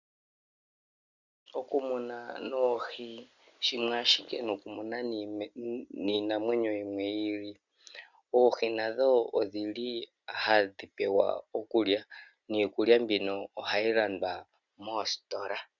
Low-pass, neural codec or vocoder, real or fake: 7.2 kHz; none; real